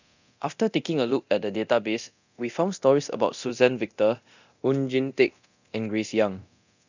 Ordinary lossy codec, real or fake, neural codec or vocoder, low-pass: none; fake; codec, 24 kHz, 0.9 kbps, DualCodec; 7.2 kHz